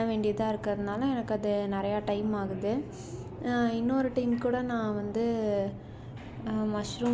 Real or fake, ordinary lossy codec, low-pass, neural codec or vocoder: real; none; none; none